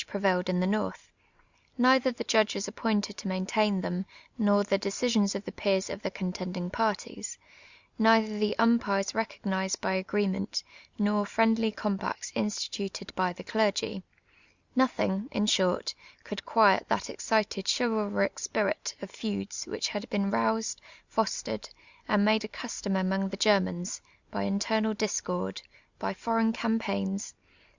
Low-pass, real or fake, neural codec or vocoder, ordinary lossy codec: 7.2 kHz; real; none; Opus, 64 kbps